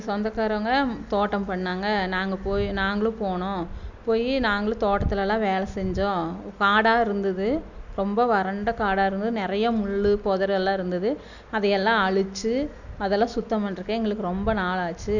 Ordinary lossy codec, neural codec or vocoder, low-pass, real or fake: none; none; 7.2 kHz; real